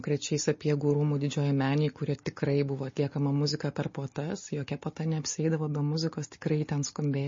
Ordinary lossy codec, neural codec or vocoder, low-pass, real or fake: MP3, 32 kbps; none; 7.2 kHz; real